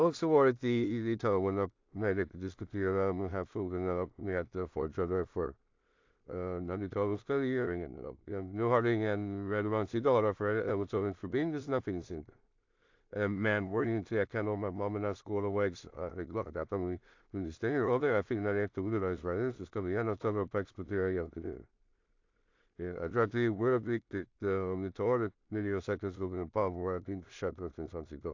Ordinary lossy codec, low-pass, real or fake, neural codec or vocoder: AAC, 48 kbps; 7.2 kHz; fake; codec, 16 kHz in and 24 kHz out, 0.4 kbps, LongCat-Audio-Codec, two codebook decoder